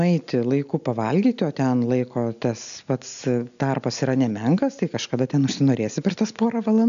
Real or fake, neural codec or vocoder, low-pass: real; none; 7.2 kHz